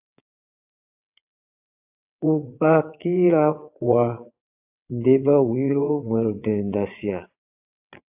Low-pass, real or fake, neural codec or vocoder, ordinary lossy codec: 3.6 kHz; fake; vocoder, 22.05 kHz, 80 mel bands, WaveNeXt; AAC, 32 kbps